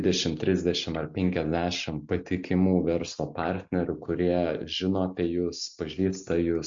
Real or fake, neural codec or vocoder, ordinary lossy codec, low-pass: real; none; MP3, 48 kbps; 7.2 kHz